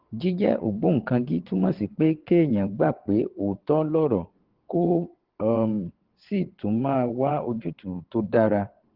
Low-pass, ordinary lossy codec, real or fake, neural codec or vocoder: 5.4 kHz; Opus, 16 kbps; fake; vocoder, 22.05 kHz, 80 mel bands, WaveNeXt